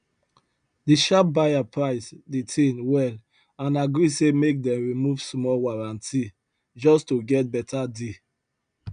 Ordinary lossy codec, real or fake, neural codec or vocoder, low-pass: none; real; none; 9.9 kHz